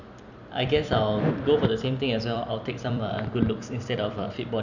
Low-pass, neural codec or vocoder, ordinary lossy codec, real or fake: 7.2 kHz; vocoder, 44.1 kHz, 128 mel bands every 256 samples, BigVGAN v2; none; fake